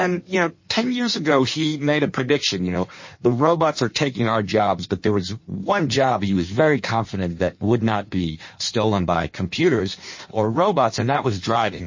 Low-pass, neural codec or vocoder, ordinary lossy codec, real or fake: 7.2 kHz; codec, 16 kHz in and 24 kHz out, 1.1 kbps, FireRedTTS-2 codec; MP3, 32 kbps; fake